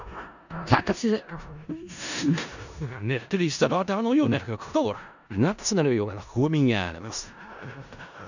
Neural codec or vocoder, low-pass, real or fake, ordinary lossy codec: codec, 16 kHz in and 24 kHz out, 0.4 kbps, LongCat-Audio-Codec, four codebook decoder; 7.2 kHz; fake; none